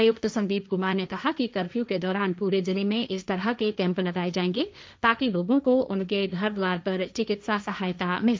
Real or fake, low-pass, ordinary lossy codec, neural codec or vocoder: fake; none; none; codec, 16 kHz, 1.1 kbps, Voila-Tokenizer